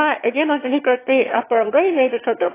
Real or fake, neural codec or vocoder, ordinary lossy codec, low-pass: fake; autoencoder, 22.05 kHz, a latent of 192 numbers a frame, VITS, trained on one speaker; AAC, 16 kbps; 3.6 kHz